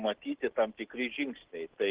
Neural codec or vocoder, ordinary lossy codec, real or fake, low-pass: none; Opus, 16 kbps; real; 3.6 kHz